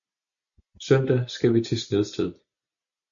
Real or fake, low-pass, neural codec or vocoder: real; 7.2 kHz; none